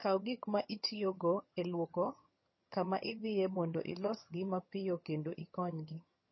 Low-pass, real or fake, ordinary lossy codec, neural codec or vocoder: 7.2 kHz; fake; MP3, 24 kbps; vocoder, 22.05 kHz, 80 mel bands, HiFi-GAN